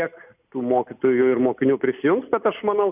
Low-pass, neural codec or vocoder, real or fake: 3.6 kHz; none; real